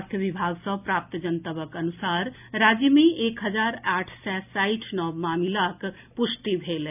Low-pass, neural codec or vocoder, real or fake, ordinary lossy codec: 3.6 kHz; none; real; none